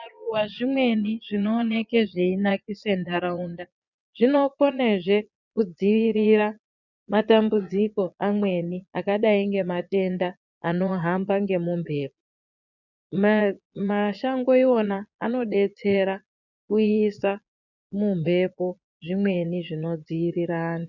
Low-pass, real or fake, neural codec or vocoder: 7.2 kHz; fake; vocoder, 22.05 kHz, 80 mel bands, Vocos